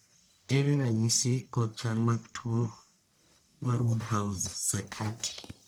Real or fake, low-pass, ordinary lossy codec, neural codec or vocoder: fake; none; none; codec, 44.1 kHz, 1.7 kbps, Pupu-Codec